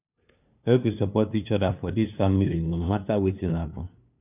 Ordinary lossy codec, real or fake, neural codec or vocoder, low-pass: none; fake; codec, 16 kHz, 2 kbps, FunCodec, trained on LibriTTS, 25 frames a second; 3.6 kHz